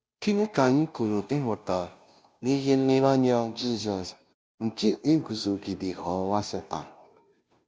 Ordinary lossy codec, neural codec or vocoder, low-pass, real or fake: none; codec, 16 kHz, 0.5 kbps, FunCodec, trained on Chinese and English, 25 frames a second; none; fake